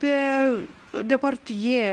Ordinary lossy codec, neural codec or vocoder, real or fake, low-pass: Opus, 32 kbps; codec, 24 kHz, 0.9 kbps, WavTokenizer, medium speech release version 1; fake; 10.8 kHz